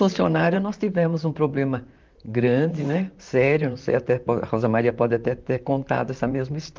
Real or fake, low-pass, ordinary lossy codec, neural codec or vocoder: fake; 7.2 kHz; Opus, 24 kbps; vocoder, 44.1 kHz, 128 mel bands, Pupu-Vocoder